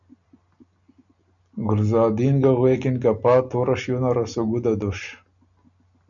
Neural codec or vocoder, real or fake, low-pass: none; real; 7.2 kHz